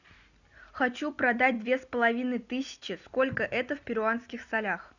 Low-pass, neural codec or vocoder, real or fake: 7.2 kHz; none; real